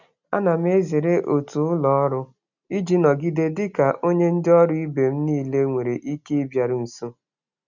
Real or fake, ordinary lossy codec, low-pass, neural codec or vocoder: real; none; 7.2 kHz; none